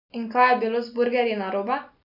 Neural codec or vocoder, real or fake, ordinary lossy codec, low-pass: none; real; none; 5.4 kHz